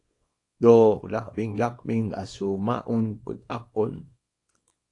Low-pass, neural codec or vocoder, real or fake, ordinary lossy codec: 10.8 kHz; codec, 24 kHz, 0.9 kbps, WavTokenizer, small release; fake; AAC, 48 kbps